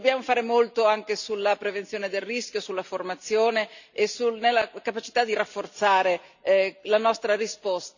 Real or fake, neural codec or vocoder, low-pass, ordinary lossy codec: real; none; 7.2 kHz; none